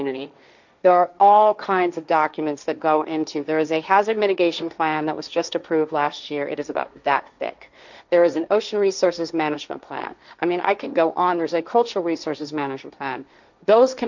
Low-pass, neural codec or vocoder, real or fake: 7.2 kHz; codec, 16 kHz, 1.1 kbps, Voila-Tokenizer; fake